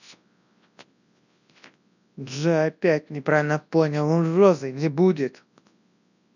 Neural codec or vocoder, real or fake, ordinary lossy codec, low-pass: codec, 24 kHz, 0.9 kbps, WavTokenizer, large speech release; fake; none; 7.2 kHz